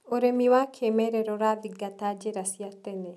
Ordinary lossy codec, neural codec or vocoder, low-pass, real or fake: none; none; none; real